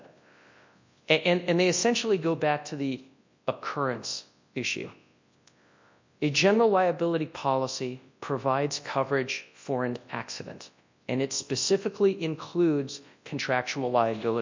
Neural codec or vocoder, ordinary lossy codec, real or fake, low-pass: codec, 24 kHz, 0.9 kbps, WavTokenizer, large speech release; MP3, 64 kbps; fake; 7.2 kHz